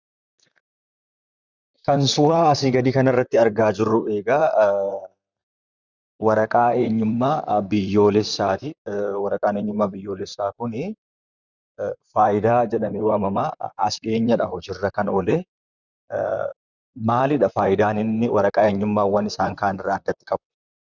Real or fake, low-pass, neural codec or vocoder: fake; 7.2 kHz; vocoder, 44.1 kHz, 128 mel bands, Pupu-Vocoder